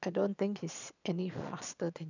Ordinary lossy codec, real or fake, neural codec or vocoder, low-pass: none; fake; vocoder, 22.05 kHz, 80 mel bands, Vocos; 7.2 kHz